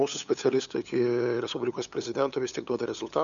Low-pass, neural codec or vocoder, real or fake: 7.2 kHz; codec, 16 kHz, 8 kbps, FunCodec, trained on LibriTTS, 25 frames a second; fake